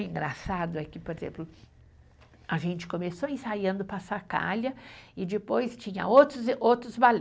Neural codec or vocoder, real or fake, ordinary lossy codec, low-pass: none; real; none; none